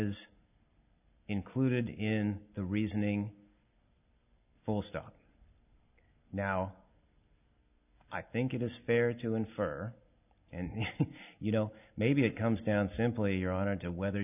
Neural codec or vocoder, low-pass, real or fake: none; 3.6 kHz; real